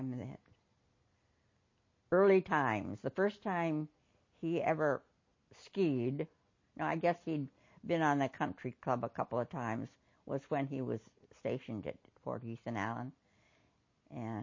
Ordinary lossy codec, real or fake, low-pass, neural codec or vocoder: MP3, 32 kbps; real; 7.2 kHz; none